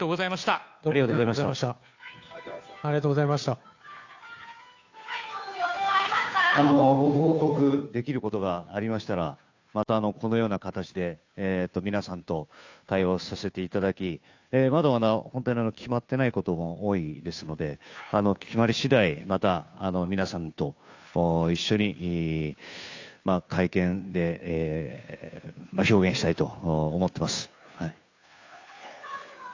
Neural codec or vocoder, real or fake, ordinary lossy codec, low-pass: codec, 16 kHz, 2 kbps, FunCodec, trained on Chinese and English, 25 frames a second; fake; AAC, 48 kbps; 7.2 kHz